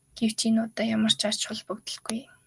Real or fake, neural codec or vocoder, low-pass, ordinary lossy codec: real; none; 10.8 kHz; Opus, 32 kbps